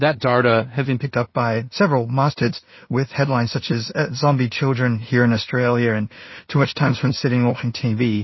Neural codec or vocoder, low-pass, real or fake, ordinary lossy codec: codec, 16 kHz in and 24 kHz out, 0.4 kbps, LongCat-Audio-Codec, two codebook decoder; 7.2 kHz; fake; MP3, 24 kbps